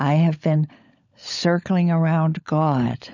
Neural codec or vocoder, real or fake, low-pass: codec, 16 kHz, 16 kbps, FunCodec, trained on LibriTTS, 50 frames a second; fake; 7.2 kHz